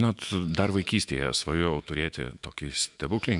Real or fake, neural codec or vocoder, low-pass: fake; autoencoder, 48 kHz, 128 numbers a frame, DAC-VAE, trained on Japanese speech; 10.8 kHz